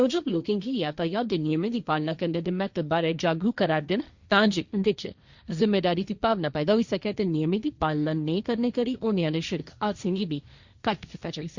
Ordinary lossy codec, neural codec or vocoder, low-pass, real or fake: Opus, 64 kbps; codec, 16 kHz, 1.1 kbps, Voila-Tokenizer; 7.2 kHz; fake